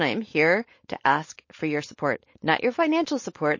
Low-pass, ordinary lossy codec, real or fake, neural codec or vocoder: 7.2 kHz; MP3, 32 kbps; fake; codec, 16 kHz, 4.8 kbps, FACodec